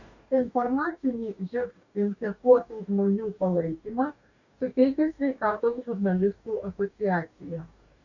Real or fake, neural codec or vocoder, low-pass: fake; codec, 44.1 kHz, 2.6 kbps, DAC; 7.2 kHz